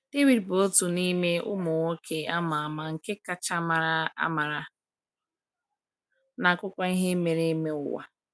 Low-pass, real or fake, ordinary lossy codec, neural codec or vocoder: none; real; none; none